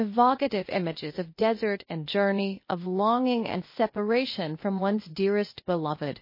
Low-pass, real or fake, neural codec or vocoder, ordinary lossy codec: 5.4 kHz; fake; codec, 16 kHz, 0.8 kbps, ZipCodec; MP3, 24 kbps